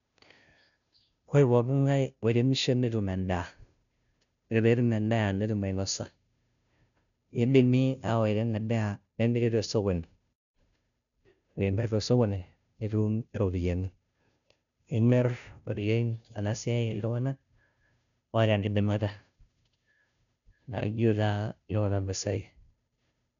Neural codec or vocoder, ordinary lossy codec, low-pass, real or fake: codec, 16 kHz, 0.5 kbps, FunCodec, trained on Chinese and English, 25 frames a second; none; 7.2 kHz; fake